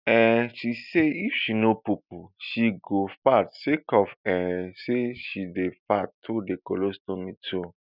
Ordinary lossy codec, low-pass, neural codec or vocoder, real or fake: none; 5.4 kHz; none; real